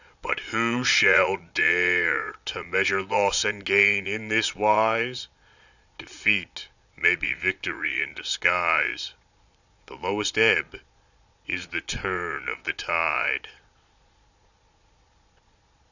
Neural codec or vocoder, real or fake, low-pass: vocoder, 22.05 kHz, 80 mel bands, Vocos; fake; 7.2 kHz